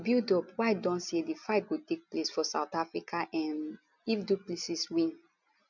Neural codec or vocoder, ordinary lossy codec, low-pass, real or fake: none; none; 7.2 kHz; real